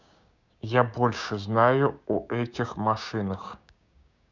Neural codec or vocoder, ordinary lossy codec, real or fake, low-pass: codec, 16 kHz, 6 kbps, DAC; none; fake; 7.2 kHz